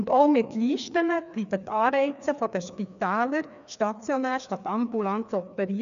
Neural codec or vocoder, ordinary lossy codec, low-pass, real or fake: codec, 16 kHz, 2 kbps, FreqCodec, larger model; none; 7.2 kHz; fake